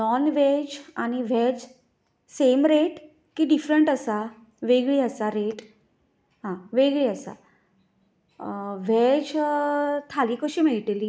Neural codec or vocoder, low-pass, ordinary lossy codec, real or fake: none; none; none; real